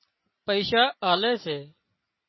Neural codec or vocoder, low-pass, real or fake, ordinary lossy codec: none; 7.2 kHz; real; MP3, 24 kbps